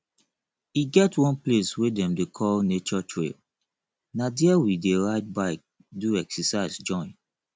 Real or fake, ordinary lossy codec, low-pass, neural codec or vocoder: real; none; none; none